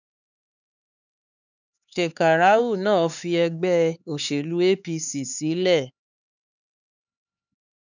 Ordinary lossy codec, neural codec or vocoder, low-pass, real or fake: none; codec, 16 kHz, 4 kbps, X-Codec, HuBERT features, trained on LibriSpeech; 7.2 kHz; fake